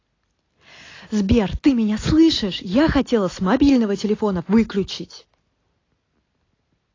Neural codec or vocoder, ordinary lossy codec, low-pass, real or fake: none; AAC, 32 kbps; 7.2 kHz; real